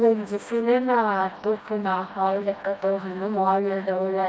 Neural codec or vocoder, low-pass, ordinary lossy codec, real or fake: codec, 16 kHz, 1 kbps, FreqCodec, smaller model; none; none; fake